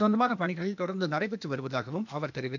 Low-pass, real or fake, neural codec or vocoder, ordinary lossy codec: 7.2 kHz; fake; codec, 16 kHz, 0.8 kbps, ZipCodec; none